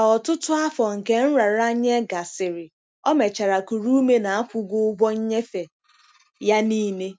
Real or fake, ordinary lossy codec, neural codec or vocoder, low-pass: real; none; none; none